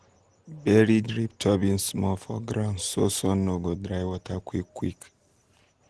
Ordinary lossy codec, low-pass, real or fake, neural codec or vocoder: Opus, 16 kbps; 10.8 kHz; real; none